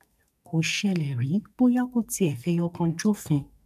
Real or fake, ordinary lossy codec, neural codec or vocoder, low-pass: fake; none; codec, 44.1 kHz, 2.6 kbps, SNAC; 14.4 kHz